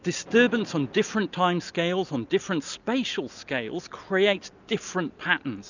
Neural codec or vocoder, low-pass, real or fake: none; 7.2 kHz; real